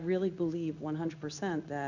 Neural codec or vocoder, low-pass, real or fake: codec, 16 kHz in and 24 kHz out, 1 kbps, XY-Tokenizer; 7.2 kHz; fake